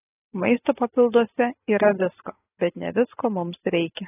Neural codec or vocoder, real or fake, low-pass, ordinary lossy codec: none; real; 3.6 kHz; AAC, 16 kbps